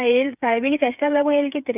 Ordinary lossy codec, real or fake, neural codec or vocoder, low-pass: none; fake; codec, 16 kHz, 8 kbps, FreqCodec, smaller model; 3.6 kHz